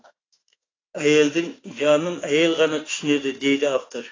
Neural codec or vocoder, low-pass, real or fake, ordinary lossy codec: autoencoder, 48 kHz, 32 numbers a frame, DAC-VAE, trained on Japanese speech; 7.2 kHz; fake; AAC, 32 kbps